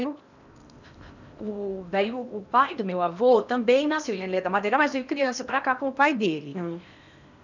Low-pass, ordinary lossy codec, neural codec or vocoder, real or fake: 7.2 kHz; none; codec, 16 kHz in and 24 kHz out, 0.8 kbps, FocalCodec, streaming, 65536 codes; fake